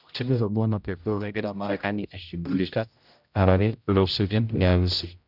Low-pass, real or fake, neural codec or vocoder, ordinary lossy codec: 5.4 kHz; fake; codec, 16 kHz, 0.5 kbps, X-Codec, HuBERT features, trained on general audio; none